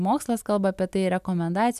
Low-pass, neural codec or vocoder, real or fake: 14.4 kHz; none; real